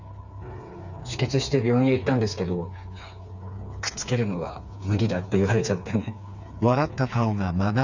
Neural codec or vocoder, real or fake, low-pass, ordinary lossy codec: codec, 16 kHz, 4 kbps, FreqCodec, smaller model; fake; 7.2 kHz; none